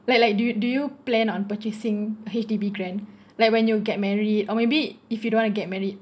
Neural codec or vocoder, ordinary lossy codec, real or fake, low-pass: none; none; real; none